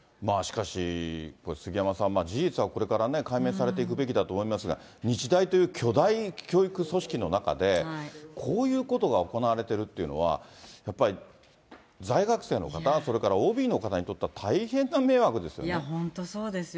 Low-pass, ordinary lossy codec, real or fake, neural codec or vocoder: none; none; real; none